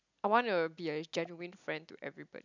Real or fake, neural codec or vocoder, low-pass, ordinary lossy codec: real; none; 7.2 kHz; none